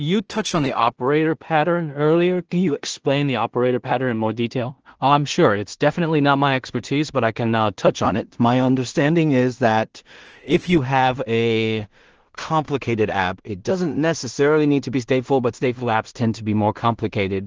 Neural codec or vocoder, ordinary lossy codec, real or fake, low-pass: codec, 16 kHz in and 24 kHz out, 0.4 kbps, LongCat-Audio-Codec, two codebook decoder; Opus, 16 kbps; fake; 7.2 kHz